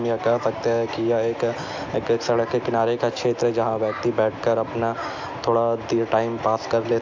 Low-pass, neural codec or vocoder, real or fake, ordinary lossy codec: 7.2 kHz; none; real; AAC, 48 kbps